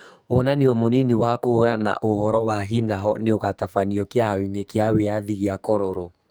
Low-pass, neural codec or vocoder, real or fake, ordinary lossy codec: none; codec, 44.1 kHz, 2.6 kbps, SNAC; fake; none